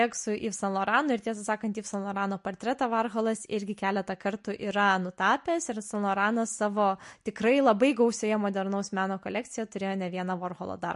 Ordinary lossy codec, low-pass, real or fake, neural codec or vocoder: MP3, 48 kbps; 10.8 kHz; real; none